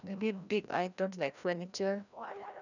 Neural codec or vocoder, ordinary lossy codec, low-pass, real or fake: codec, 16 kHz, 1 kbps, FreqCodec, larger model; none; 7.2 kHz; fake